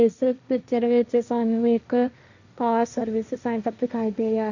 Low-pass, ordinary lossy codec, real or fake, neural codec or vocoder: 7.2 kHz; none; fake; codec, 16 kHz, 1.1 kbps, Voila-Tokenizer